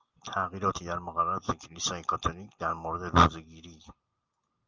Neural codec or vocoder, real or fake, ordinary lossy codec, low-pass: none; real; Opus, 24 kbps; 7.2 kHz